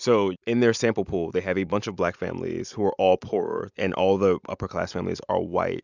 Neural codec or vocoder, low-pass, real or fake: none; 7.2 kHz; real